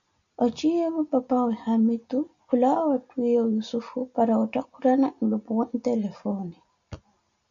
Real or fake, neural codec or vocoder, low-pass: real; none; 7.2 kHz